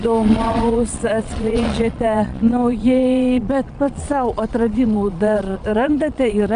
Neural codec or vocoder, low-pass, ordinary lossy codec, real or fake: vocoder, 22.05 kHz, 80 mel bands, Vocos; 9.9 kHz; MP3, 96 kbps; fake